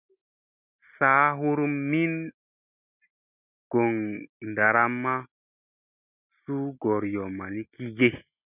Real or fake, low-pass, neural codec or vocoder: real; 3.6 kHz; none